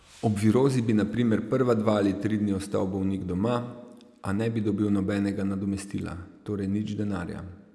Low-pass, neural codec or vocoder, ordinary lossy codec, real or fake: none; none; none; real